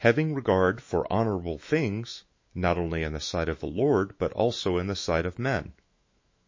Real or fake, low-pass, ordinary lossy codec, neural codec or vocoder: fake; 7.2 kHz; MP3, 32 kbps; autoencoder, 48 kHz, 128 numbers a frame, DAC-VAE, trained on Japanese speech